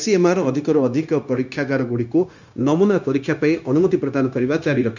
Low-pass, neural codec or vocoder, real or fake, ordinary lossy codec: 7.2 kHz; codec, 16 kHz, 0.9 kbps, LongCat-Audio-Codec; fake; none